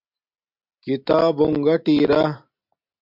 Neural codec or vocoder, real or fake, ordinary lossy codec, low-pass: none; real; MP3, 48 kbps; 5.4 kHz